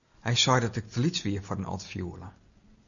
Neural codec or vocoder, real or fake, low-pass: none; real; 7.2 kHz